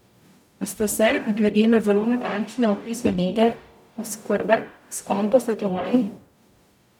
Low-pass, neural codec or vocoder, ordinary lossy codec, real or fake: 19.8 kHz; codec, 44.1 kHz, 0.9 kbps, DAC; none; fake